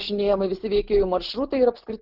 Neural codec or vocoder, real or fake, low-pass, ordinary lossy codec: none; real; 5.4 kHz; Opus, 16 kbps